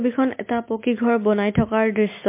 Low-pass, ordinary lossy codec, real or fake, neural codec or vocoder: 3.6 kHz; MP3, 24 kbps; real; none